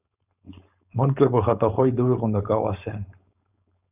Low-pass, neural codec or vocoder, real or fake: 3.6 kHz; codec, 16 kHz, 4.8 kbps, FACodec; fake